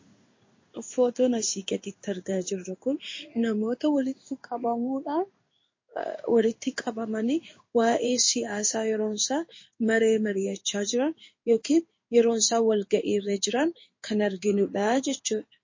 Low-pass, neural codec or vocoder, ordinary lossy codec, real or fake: 7.2 kHz; codec, 16 kHz in and 24 kHz out, 1 kbps, XY-Tokenizer; MP3, 32 kbps; fake